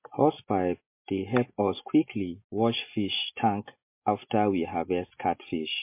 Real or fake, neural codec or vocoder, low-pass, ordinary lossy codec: real; none; 3.6 kHz; MP3, 24 kbps